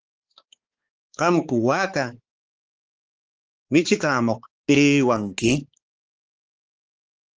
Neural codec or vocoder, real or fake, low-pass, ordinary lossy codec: codec, 16 kHz, 4 kbps, X-Codec, HuBERT features, trained on balanced general audio; fake; 7.2 kHz; Opus, 16 kbps